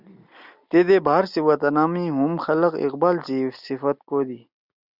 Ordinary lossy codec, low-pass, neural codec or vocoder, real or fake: Opus, 64 kbps; 5.4 kHz; none; real